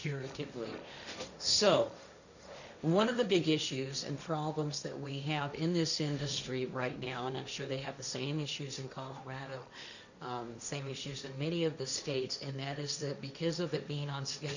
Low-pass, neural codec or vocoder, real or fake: 7.2 kHz; codec, 16 kHz, 1.1 kbps, Voila-Tokenizer; fake